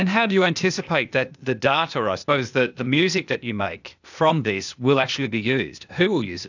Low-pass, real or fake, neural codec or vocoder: 7.2 kHz; fake; codec, 16 kHz, 0.8 kbps, ZipCodec